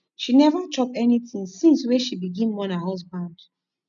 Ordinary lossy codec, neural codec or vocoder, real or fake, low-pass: none; none; real; 7.2 kHz